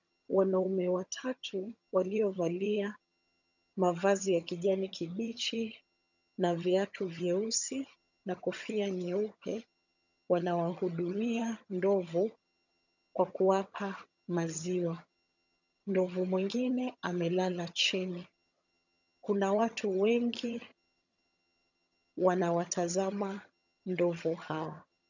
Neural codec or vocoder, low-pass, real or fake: vocoder, 22.05 kHz, 80 mel bands, HiFi-GAN; 7.2 kHz; fake